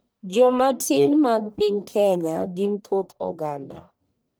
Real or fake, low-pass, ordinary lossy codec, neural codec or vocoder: fake; none; none; codec, 44.1 kHz, 1.7 kbps, Pupu-Codec